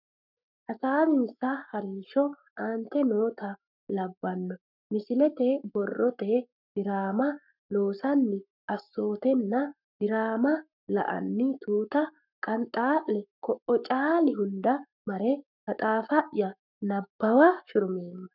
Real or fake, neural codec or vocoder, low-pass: fake; codec, 44.1 kHz, 7.8 kbps, Pupu-Codec; 5.4 kHz